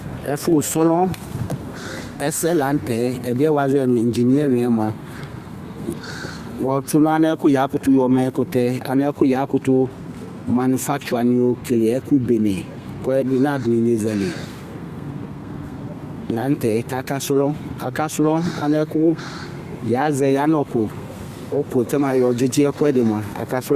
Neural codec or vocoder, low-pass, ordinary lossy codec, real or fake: codec, 32 kHz, 1.9 kbps, SNAC; 14.4 kHz; Opus, 64 kbps; fake